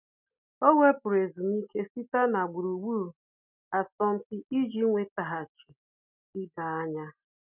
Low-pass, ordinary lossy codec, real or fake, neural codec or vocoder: 3.6 kHz; none; real; none